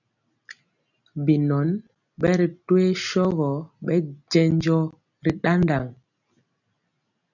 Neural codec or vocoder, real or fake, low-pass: none; real; 7.2 kHz